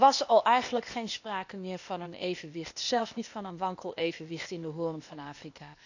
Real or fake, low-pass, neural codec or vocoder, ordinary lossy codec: fake; 7.2 kHz; codec, 16 kHz, 0.8 kbps, ZipCodec; none